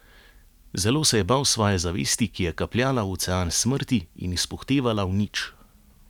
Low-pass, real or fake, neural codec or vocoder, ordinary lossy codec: 19.8 kHz; real; none; none